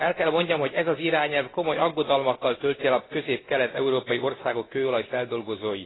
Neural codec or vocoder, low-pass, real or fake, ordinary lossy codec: none; 7.2 kHz; real; AAC, 16 kbps